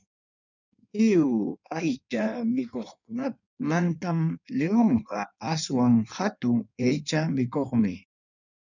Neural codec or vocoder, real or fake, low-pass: codec, 16 kHz in and 24 kHz out, 1.1 kbps, FireRedTTS-2 codec; fake; 7.2 kHz